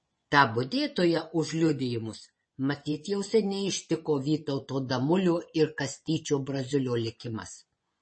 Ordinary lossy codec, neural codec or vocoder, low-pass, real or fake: MP3, 32 kbps; vocoder, 24 kHz, 100 mel bands, Vocos; 9.9 kHz; fake